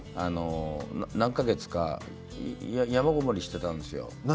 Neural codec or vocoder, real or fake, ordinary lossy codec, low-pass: none; real; none; none